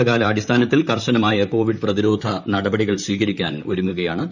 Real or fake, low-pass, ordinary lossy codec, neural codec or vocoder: fake; 7.2 kHz; none; vocoder, 44.1 kHz, 128 mel bands, Pupu-Vocoder